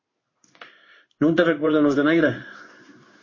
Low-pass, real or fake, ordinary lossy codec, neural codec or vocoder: 7.2 kHz; fake; MP3, 32 kbps; codec, 16 kHz in and 24 kHz out, 1 kbps, XY-Tokenizer